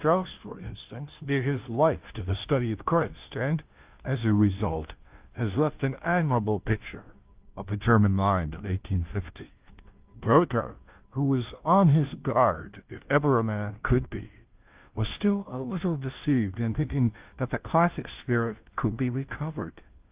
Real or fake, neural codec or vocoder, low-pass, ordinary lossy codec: fake; codec, 16 kHz, 0.5 kbps, FunCodec, trained on Chinese and English, 25 frames a second; 3.6 kHz; Opus, 32 kbps